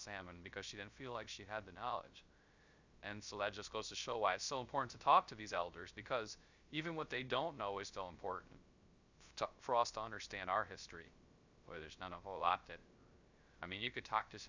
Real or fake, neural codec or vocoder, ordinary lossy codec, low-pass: fake; codec, 16 kHz, 0.3 kbps, FocalCodec; Opus, 64 kbps; 7.2 kHz